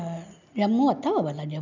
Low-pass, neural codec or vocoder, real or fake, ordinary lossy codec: 7.2 kHz; none; real; none